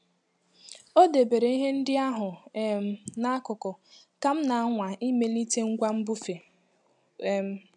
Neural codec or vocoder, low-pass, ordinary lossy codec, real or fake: none; 10.8 kHz; none; real